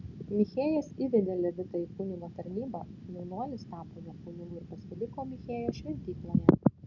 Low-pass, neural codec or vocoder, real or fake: 7.2 kHz; none; real